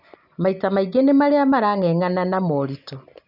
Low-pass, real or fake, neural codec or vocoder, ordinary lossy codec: 5.4 kHz; real; none; none